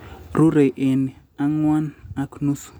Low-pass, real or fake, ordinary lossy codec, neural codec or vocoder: none; real; none; none